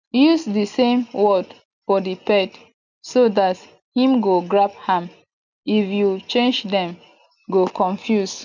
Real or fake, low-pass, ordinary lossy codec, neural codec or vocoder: real; 7.2 kHz; none; none